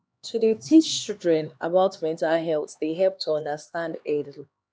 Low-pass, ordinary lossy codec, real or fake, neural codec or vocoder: none; none; fake; codec, 16 kHz, 2 kbps, X-Codec, HuBERT features, trained on LibriSpeech